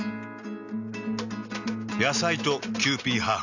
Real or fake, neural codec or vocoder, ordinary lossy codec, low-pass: real; none; none; 7.2 kHz